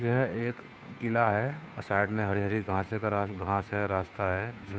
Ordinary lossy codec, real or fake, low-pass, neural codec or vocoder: none; fake; none; codec, 16 kHz, 2 kbps, FunCodec, trained on Chinese and English, 25 frames a second